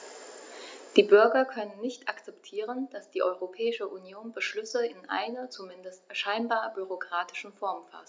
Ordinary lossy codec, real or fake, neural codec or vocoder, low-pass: none; real; none; none